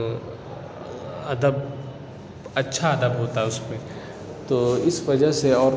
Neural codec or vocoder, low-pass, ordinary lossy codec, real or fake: none; none; none; real